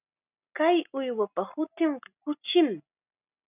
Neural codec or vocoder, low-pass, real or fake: none; 3.6 kHz; real